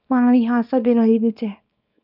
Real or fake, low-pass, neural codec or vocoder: fake; 5.4 kHz; codec, 24 kHz, 0.9 kbps, WavTokenizer, small release